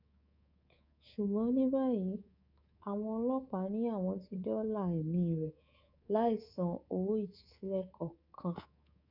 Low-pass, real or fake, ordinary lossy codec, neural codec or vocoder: 5.4 kHz; fake; none; codec, 24 kHz, 3.1 kbps, DualCodec